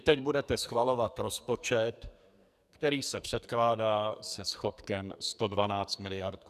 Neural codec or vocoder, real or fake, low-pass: codec, 44.1 kHz, 2.6 kbps, SNAC; fake; 14.4 kHz